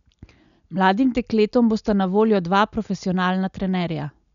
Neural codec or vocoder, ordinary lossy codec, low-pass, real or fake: none; none; 7.2 kHz; real